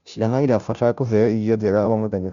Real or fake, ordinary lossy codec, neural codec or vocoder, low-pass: fake; Opus, 64 kbps; codec, 16 kHz, 0.5 kbps, FunCodec, trained on Chinese and English, 25 frames a second; 7.2 kHz